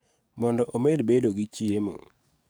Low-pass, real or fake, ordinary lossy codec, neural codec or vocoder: none; fake; none; codec, 44.1 kHz, 7.8 kbps, DAC